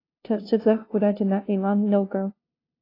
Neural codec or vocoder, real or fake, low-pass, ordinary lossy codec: codec, 16 kHz, 0.5 kbps, FunCodec, trained on LibriTTS, 25 frames a second; fake; 5.4 kHz; AAC, 32 kbps